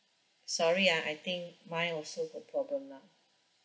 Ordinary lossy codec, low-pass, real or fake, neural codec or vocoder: none; none; real; none